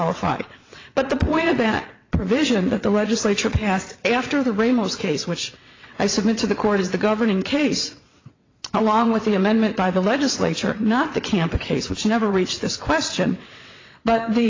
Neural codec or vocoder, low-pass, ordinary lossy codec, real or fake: vocoder, 22.05 kHz, 80 mel bands, WaveNeXt; 7.2 kHz; AAC, 32 kbps; fake